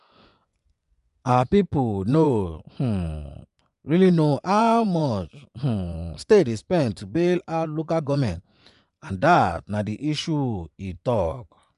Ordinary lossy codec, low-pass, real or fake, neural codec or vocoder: none; 10.8 kHz; fake; vocoder, 24 kHz, 100 mel bands, Vocos